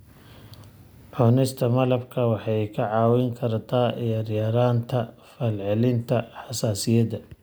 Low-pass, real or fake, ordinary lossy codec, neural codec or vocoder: none; real; none; none